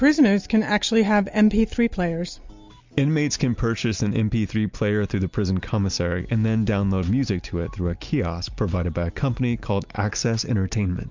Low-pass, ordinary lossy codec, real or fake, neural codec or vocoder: 7.2 kHz; MP3, 64 kbps; real; none